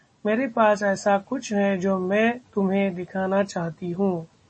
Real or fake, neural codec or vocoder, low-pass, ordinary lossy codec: real; none; 9.9 kHz; MP3, 32 kbps